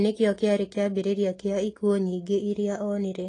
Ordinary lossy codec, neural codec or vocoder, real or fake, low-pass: AAC, 32 kbps; none; real; 10.8 kHz